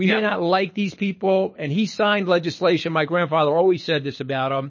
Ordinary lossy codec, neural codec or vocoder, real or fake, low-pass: MP3, 32 kbps; codec, 24 kHz, 6 kbps, HILCodec; fake; 7.2 kHz